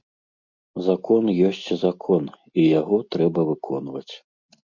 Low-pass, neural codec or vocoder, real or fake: 7.2 kHz; none; real